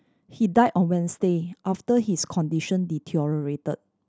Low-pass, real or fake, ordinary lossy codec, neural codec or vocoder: none; real; none; none